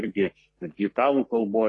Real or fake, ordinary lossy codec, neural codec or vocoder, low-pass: fake; AAC, 32 kbps; codec, 44.1 kHz, 3.4 kbps, Pupu-Codec; 10.8 kHz